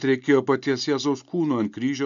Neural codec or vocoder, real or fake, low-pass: none; real; 7.2 kHz